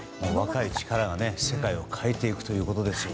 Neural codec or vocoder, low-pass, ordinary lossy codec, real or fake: none; none; none; real